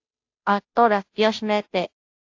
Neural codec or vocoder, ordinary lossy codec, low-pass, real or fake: codec, 16 kHz, 0.5 kbps, FunCodec, trained on Chinese and English, 25 frames a second; AAC, 48 kbps; 7.2 kHz; fake